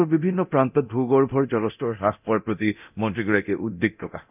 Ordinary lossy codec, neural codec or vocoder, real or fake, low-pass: none; codec, 24 kHz, 0.9 kbps, DualCodec; fake; 3.6 kHz